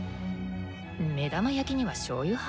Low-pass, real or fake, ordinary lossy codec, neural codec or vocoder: none; real; none; none